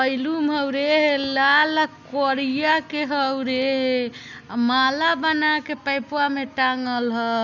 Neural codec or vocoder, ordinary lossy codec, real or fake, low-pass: none; none; real; 7.2 kHz